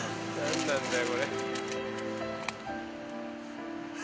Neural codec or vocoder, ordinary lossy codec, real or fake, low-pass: none; none; real; none